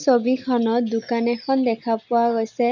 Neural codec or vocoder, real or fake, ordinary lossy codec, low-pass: none; real; none; 7.2 kHz